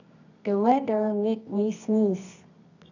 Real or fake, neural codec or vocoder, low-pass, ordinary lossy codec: fake; codec, 24 kHz, 0.9 kbps, WavTokenizer, medium music audio release; 7.2 kHz; none